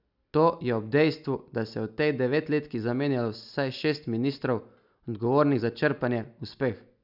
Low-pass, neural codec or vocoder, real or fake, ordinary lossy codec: 5.4 kHz; none; real; none